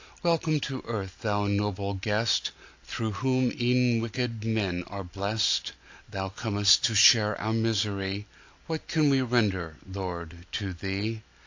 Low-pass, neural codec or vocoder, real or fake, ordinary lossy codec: 7.2 kHz; none; real; AAC, 48 kbps